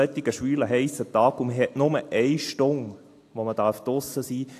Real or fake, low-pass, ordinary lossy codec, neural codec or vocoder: real; 14.4 kHz; AAC, 64 kbps; none